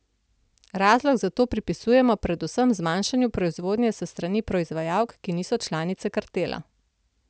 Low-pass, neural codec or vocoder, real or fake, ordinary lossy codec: none; none; real; none